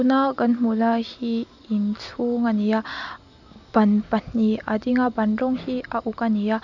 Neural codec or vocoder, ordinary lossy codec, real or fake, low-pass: none; none; real; 7.2 kHz